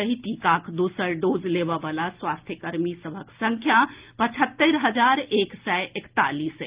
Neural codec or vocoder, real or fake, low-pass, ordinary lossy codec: none; real; 3.6 kHz; Opus, 32 kbps